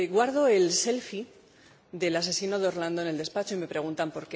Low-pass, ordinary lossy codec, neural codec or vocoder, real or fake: none; none; none; real